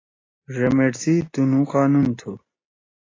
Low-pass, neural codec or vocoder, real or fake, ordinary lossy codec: 7.2 kHz; none; real; AAC, 32 kbps